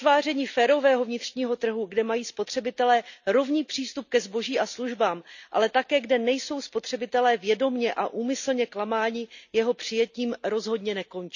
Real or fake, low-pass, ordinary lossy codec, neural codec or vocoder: real; 7.2 kHz; none; none